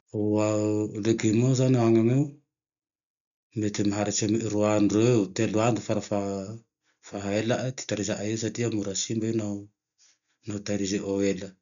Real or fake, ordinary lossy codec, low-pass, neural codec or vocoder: real; none; 7.2 kHz; none